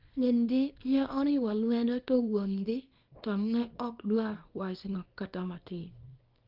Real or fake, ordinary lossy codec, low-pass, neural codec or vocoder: fake; Opus, 24 kbps; 5.4 kHz; codec, 24 kHz, 0.9 kbps, WavTokenizer, small release